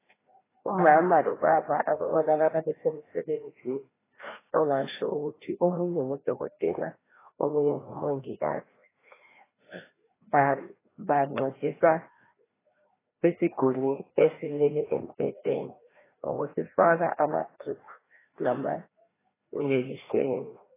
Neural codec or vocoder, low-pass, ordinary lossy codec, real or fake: codec, 16 kHz, 1 kbps, FreqCodec, larger model; 3.6 kHz; AAC, 16 kbps; fake